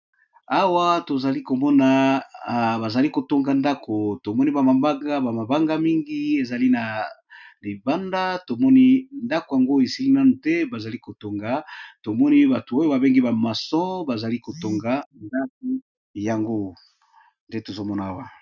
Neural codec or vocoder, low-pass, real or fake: none; 7.2 kHz; real